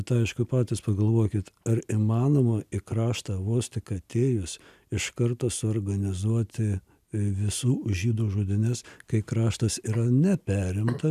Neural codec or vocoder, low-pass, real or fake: none; 14.4 kHz; real